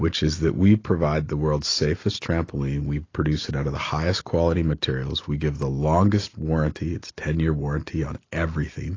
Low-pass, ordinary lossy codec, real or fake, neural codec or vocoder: 7.2 kHz; AAC, 32 kbps; real; none